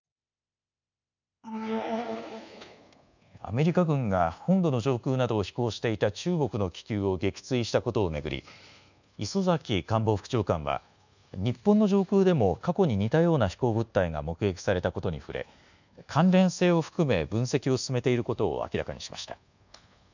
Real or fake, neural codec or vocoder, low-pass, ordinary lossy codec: fake; codec, 24 kHz, 1.2 kbps, DualCodec; 7.2 kHz; none